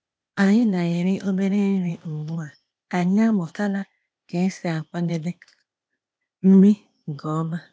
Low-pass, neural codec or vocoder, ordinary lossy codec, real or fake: none; codec, 16 kHz, 0.8 kbps, ZipCodec; none; fake